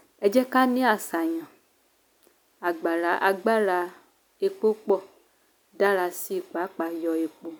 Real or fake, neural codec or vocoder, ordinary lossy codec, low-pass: real; none; none; none